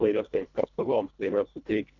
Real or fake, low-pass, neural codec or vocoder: fake; 7.2 kHz; codec, 24 kHz, 1.5 kbps, HILCodec